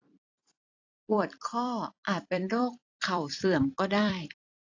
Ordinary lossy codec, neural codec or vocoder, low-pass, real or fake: AAC, 48 kbps; vocoder, 44.1 kHz, 128 mel bands every 256 samples, BigVGAN v2; 7.2 kHz; fake